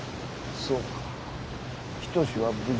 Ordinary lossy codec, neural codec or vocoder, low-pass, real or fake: none; none; none; real